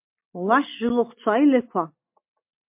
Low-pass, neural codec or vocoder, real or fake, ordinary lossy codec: 3.6 kHz; none; real; MP3, 32 kbps